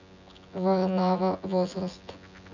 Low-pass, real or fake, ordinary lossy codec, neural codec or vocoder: 7.2 kHz; fake; none; vocoder, 24 kHz, 100 mel bands, Vocos